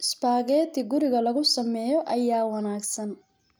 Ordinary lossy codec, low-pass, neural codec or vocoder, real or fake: none; none; none; real